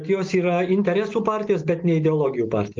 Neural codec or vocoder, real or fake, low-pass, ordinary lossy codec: none; real; 7.2 kHz; Opus, 24 kbps